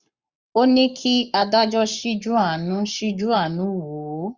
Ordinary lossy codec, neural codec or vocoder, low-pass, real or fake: Opus, 64 kbps; codec, 44.1 kHz, 7.8 kbps, Pupu-Codec; 7.2 kHz; fake